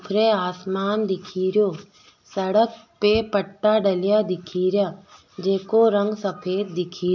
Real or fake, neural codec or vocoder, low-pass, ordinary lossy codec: real; none; 7.2 kHz; none